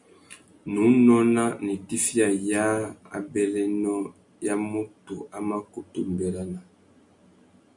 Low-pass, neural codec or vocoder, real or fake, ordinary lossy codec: 10.8 kHz; none; real; MP3, 96 kbps